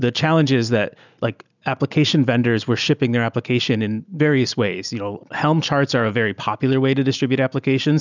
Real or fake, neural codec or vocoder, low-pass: real; none; 7.2 kHz